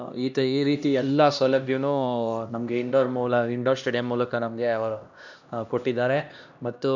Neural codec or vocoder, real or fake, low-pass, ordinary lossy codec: codec, 16 kHz, 1 kbps, X-Codec, HuBERT features, trained on LibriSpeech; fake; 7.2 kHz; none